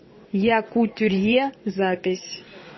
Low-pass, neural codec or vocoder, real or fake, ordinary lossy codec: 7.2 kHz; vocoder, 22.05 kHz, 80 mel bands, WaveNeXt; fake; MP3, 24 kbps